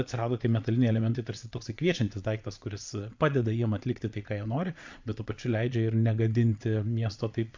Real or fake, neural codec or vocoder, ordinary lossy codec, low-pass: fake; vocoder, 22.05 kHz, 80 mel bands, Vocos; MP3, 64 kbps; 7.2 kHz